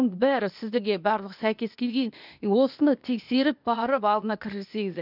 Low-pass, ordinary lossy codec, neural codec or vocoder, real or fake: 5.4 kHz; none; codec, 16 kHz, 0.8 kbps, ZipCodec; fake